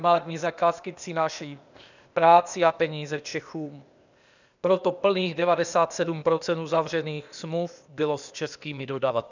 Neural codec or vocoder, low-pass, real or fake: codec, 16 kHz, 0.8 kbps, ZipCodec; 7.2 kHz; fake